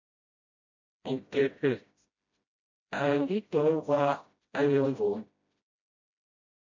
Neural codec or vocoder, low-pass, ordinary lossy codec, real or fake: codec, 16 kHz, 0.5 kbps, FreqCodec, smaller model; 7.2 kHz; MP3, 48 kbps; fake